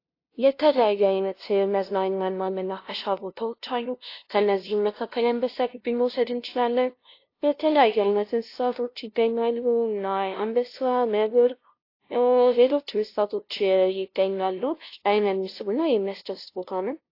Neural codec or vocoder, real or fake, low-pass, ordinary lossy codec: codec, 16 kHz, 0.5 kbps, FunCodec, trained on LibriTTS, 25 frames a second; fake; 5.4 kHz; AAC, 32 kbps